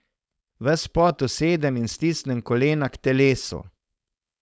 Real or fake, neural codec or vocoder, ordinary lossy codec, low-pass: fake; codec, 16 kHz, 4.8 kbps, FACodec; none; none